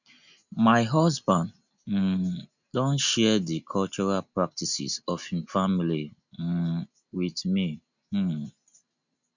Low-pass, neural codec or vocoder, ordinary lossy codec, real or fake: 7.2 kHz; none; none; real